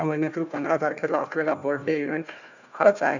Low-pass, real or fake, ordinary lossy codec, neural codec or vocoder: 7.2 kHz; fake; none; codec, 16 kHz, 1 kbps, FunCodec, trained on Chinese and English, 50 frames a second